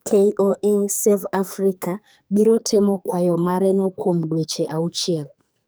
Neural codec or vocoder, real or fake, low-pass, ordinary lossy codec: codec, 44.1 kHz, 2.6 kbps, SNAC; fake; none; none